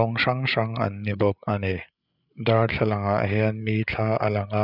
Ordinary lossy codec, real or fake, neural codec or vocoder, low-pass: none; fake; codec, 16 kHz, 8 kbps, FreqCodec, larger model; 5.4 kHz